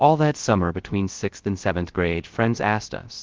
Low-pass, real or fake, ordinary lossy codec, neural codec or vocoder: 7.2 kHz; fake; Opus, 16 kbps; codec, 16 kHz, 0.3 kbps, FocalCodec